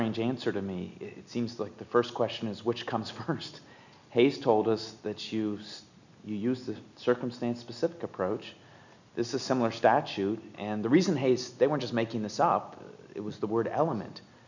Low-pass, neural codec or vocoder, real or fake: 7.2 kHz; none; real